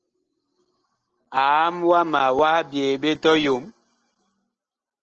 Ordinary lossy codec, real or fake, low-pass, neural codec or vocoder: Opus, 16 kbps; real; 7.2 kHz; none